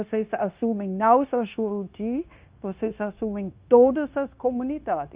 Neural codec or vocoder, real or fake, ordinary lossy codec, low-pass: codec, 16 kHz, 0.9 kbps, LongCat-Audio-Codec; fake; Opus, 32 kbps; 3.6 kHz